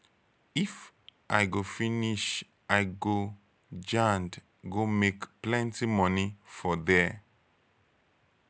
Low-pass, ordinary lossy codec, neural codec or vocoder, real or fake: none; none; none; real